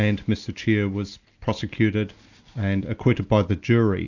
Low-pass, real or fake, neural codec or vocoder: 7.2 kHz; real; none